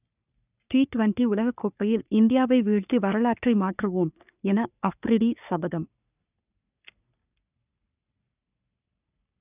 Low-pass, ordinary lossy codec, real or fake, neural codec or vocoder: 3.6 kHz; none; fake; codec, 44.1 kHz, 3.4 kbps, Pupu-Codec